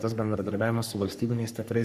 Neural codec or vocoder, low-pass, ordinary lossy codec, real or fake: codec, 44.1 kHz, 3.4 kbps, Pupu-Codec; 14.4 kHz; Opus, 64 kbps; fake